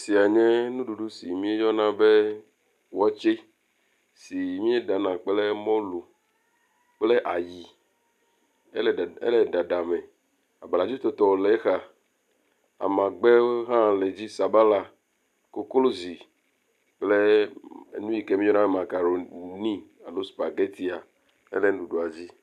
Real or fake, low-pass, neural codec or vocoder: real; 14.4 kHz; none